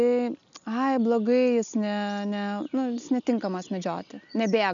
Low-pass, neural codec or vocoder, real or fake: 7.2 kHz; none; real